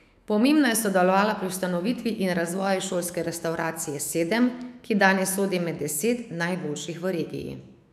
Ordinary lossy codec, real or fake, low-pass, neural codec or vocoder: none; fake; 14.4 kHz; autoencoder, 48 kHz, 128 numbers a frame, DAC-VAE, trained on Japanese speech